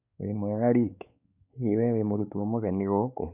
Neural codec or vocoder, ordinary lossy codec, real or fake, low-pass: codec, 16 kHz, 4 kbps, X-Codec, WavLM features, trained on Multilingual LibriSpeech; none; fake; 3.6 kHz